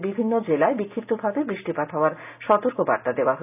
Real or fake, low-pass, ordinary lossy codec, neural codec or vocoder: real; 3.6 kHz; none; none